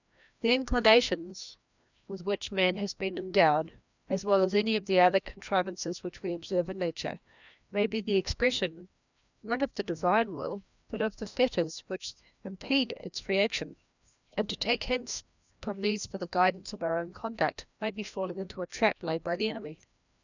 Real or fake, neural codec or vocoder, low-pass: fake; codec, 16 kHz, 1 kbps, FreqCodec, larger model; 7.2 kHz